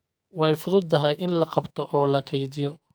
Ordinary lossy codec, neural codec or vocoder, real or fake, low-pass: none; codec, 44.1 kHz, 2.6 kbps, SNAC; fake; none